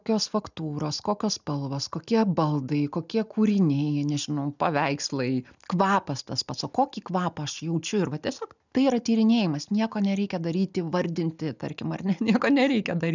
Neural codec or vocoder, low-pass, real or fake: none; 7.2 kHz; real